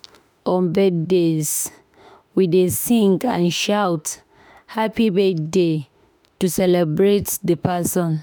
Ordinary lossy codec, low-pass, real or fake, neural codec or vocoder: none; none; fake; autoencoder, 48 kHz, 32 numbers a frame, DAC-VAE, trained on Japanese speech